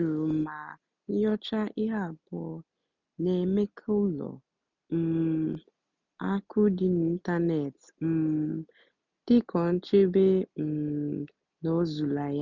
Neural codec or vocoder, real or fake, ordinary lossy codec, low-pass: none; real; none; 7.2 kHz